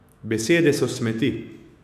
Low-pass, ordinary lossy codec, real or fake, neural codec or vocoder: 14.4 kHz; none; fake; autoencoder, 48 kHz, 128 numbers a frame, DAC-VAE, trained on Japanese speech